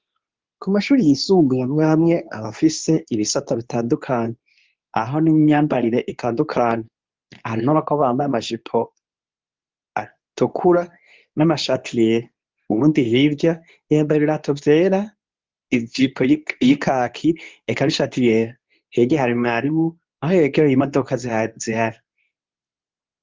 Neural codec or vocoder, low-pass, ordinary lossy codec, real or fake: codec, 24 kHz, 0.9 kbps, WavTokenizer, medium speech release version 2; 7.2 kHz; Opus, 32 kbps; fake